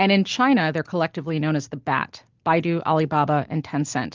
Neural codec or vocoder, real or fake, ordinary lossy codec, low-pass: none; real; Opus, 24 kbps; 7.2 kHz